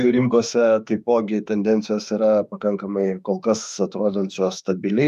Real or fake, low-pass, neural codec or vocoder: fake; 14.4 kHz; autoencoder, 48 kHz, 32 numbers a frame, DAC-VAE, trained on Japanese speech